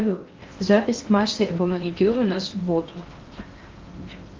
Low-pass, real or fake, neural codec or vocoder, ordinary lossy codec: 7.2 kHz; fake; codec, 16 kHz in and 24 kHz out, 0.6 kbps, FocalCodec, streaming, 4096 codes; Opus, 32 kbps